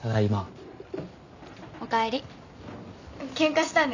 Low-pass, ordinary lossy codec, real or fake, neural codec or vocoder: 7.2 kHz; none; real; none